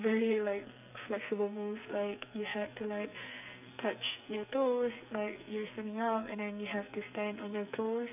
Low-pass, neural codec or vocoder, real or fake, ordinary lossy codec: 3.6 kHz; codec, 44.1 kHz, 2.6 kbps, SNAC; fake; none